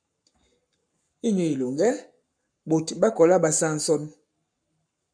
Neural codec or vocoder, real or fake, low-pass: codec, 44.1 kHz, 7.8 kbps, Pupu-Codec; fake; 9.9 kHz